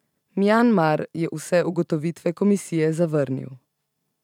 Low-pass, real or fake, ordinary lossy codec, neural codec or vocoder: 19.8 kHz; fake; none; vocoder, 44.1 kHz, 128 mel bands every 512 samples, BigVGAN v2